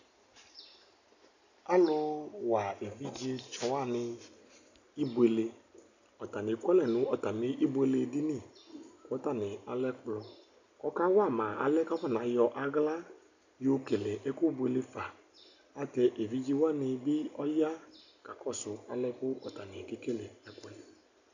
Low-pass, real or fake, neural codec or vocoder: 7.2 kHz; real; none